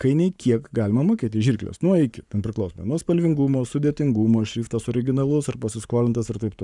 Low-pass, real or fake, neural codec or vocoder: 10.8 kHz; fake; codec, 44.1 kHz, 7.8 kbps, Pupu-Codec